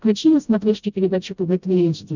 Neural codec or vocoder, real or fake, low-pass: codec, 16 kHz, 0.5 kbps, FreqCodec, smaller model; fake; 7.2 kHz